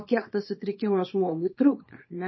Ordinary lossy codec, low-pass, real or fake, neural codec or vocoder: MP3, 24 kbps; 7.2 kHz; fake; codec, 24 kHz, 0.9 kbps, WavTokenizer, small release